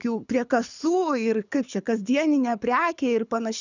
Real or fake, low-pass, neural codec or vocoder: fake; 7.2 kHz; codec, 24 kHz, 6 kbps, HILCodec